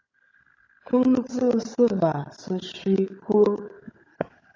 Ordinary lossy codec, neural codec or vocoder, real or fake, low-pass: AAC, 32 kbps; codec, 16 kHz, 4 kbps, FunCodec, trained on Chinese and English, 50 frames a second; fake; 7.2 kHz